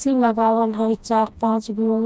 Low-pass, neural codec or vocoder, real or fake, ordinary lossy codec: none; codec, 16 kHz, 1 kbps, FreqCodec, smaller model; fake; none